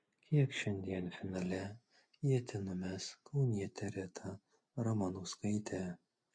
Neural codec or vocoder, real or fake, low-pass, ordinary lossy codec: none; real; 9.9 kHz; MP3, 48 kbps